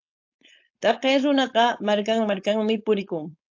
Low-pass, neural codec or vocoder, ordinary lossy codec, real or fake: 7.2 kHz; codec, 16 kHz, 4.8 kbps, FACodec; AAC, 48 kbps; fake